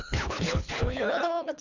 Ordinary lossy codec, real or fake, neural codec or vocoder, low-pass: none; fake; codec, 24 kHz, 3 kbps, HILCodec; 7.2 kHz